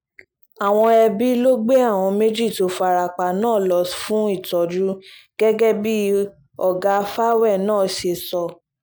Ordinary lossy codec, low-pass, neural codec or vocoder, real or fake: none; none; none; real